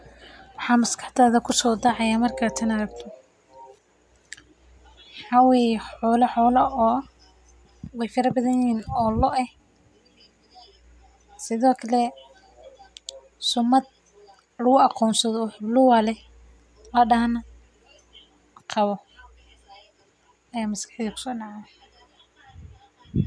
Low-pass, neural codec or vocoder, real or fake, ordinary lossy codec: none; none; real; none